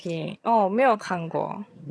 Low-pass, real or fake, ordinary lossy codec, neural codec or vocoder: none; fake; none; vocoder, 22.05 kHz, 80 mel bands, HiFi-GAN